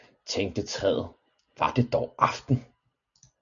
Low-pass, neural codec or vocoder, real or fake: 7.2 kHz; none; real